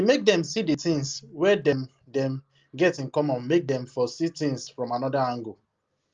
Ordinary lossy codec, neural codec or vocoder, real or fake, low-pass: Opus, 32 kbps; none; real; 7.2 kHz